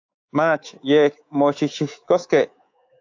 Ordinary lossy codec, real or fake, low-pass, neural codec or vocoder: AAC, 48 kbps; fake; 7.2 kHz; codec, 24 kHz, 3.1 kbps, DualCodec